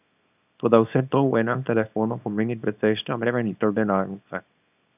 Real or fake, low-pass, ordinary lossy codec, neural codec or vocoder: fake; 3.6 kHz; none; codec, 24 kHz, 0.9 kbps, WavTokenizer, small release